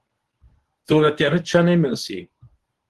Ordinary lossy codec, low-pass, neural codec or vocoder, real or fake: Opus, 16 kbps; 10.8 kHz; codec, 24 kHz, 0.9 kbps, WavTokenizer, medium speech release version 1; fake